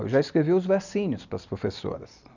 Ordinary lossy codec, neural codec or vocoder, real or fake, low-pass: none; none; real; 7.2 kHz